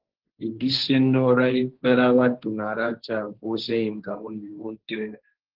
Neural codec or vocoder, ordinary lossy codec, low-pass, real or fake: codec, 16 kHz, 1.1 kbps, Voila-Tokenizer; Opus, 16 kbps; 5.4 kHz; fake